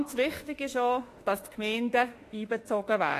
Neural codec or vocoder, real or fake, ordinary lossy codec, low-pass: autoencoder, 48 kHz, 32 numbers a frame, DAC-VAE, trained on Japanese speech; fake; AAC, 48 kbps; 14.4 kHz